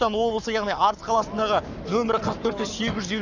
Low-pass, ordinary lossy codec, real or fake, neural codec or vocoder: 7.2 kHz; none; fake; codec, 44.1 kHz, 7.8 kbps, Pupu-Codec